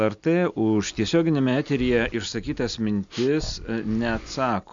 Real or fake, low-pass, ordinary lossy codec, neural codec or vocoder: real; 7.2 kHz; MP3, 64 kbps; none